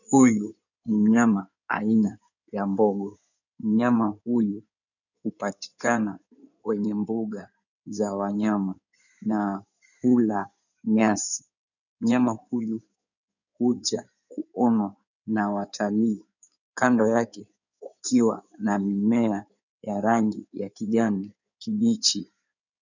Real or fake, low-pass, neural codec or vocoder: fake; 7.2 kHz; codec, 16 kHz in and 24 kHz out, 2.2 kbps, FireRedTTS-2 codec